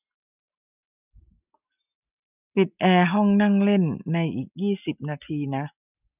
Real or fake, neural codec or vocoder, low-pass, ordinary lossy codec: real; none; 3.6 kHz; none